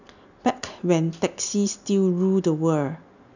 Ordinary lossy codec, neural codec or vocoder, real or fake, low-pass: none; none; real; 7.2 kHz